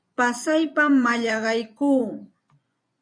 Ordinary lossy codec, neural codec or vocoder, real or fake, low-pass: MP3, 96 kbps; vocoder, 24 kHz, 100 mel bands, Vocos; fake; 9.9 kHz